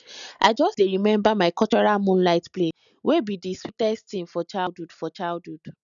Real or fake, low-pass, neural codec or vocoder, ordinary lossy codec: real; 7.2 kHz; none; none